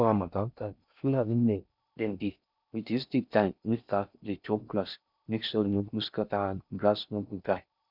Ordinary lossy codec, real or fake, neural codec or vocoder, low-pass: none; fake; codec, 16 kHz in and 24 kHz out, 0.6 kbps, FocalCodec, streaming, 4096 codes; 5.4 kHz